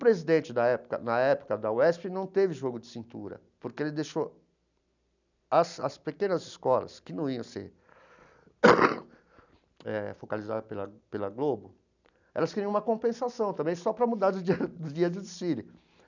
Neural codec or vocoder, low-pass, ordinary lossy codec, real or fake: none; 7.2 kHz; none; real